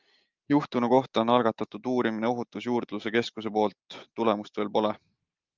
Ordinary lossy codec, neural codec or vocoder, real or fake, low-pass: Opus, 24 kbps; none; real; 7.2 kHz